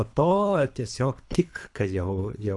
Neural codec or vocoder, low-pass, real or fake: codec, 24 kHz, 3 kbps, HILCodec; 10.8 kHz; fake